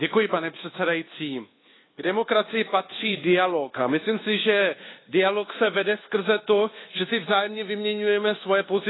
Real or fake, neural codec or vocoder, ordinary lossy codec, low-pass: fake; codec, 24 kHz, 1.2 kbps, DualCodec; AAC, 16 kbps; 7.2 kHz